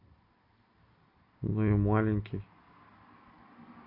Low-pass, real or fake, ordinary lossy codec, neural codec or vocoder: 5.4 kHz; real; AAC, 32 kbps; none